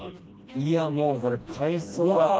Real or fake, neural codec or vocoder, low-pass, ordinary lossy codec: fake; codec, 16 kHz, 1 kbps, FreqCodec, smaller model; none; none